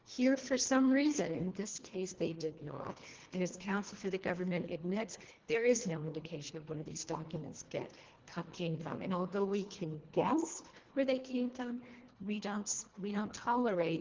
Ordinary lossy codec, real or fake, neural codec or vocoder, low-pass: Opus, 16 kbps; fake; codec, 24 kHz, 1.5 kbps, HILCodec; 7.2 kHz